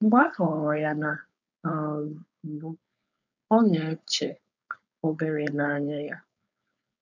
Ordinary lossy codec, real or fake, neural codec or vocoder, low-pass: none; fake; codec, 16 kHz, 4.8 kbps, FACodec; 7.2 kHz